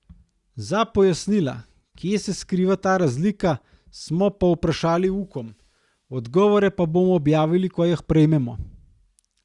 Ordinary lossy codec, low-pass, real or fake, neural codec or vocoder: Opus, 64 kbps; 10.8 kHz; real; none